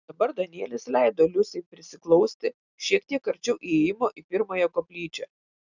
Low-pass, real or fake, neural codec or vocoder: 7.2 kHz; real; none